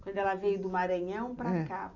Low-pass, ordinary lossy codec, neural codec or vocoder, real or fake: 7.2 kHz; none; none; real